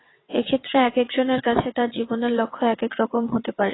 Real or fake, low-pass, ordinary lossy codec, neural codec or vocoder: fake; 7.2 kHz; AAC, 16 kbps; vocoder, 22.05 kHz, 80 mel bands, WaveNeXt